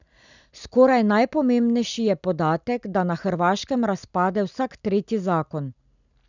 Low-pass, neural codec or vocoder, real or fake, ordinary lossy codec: 7.2 kHz; none; real; none